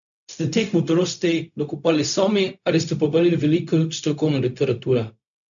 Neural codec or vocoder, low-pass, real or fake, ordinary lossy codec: codec, 16 kHz, 0.4 kbps, LongCat-Audio-Codec; 7.2 kHz; fake; none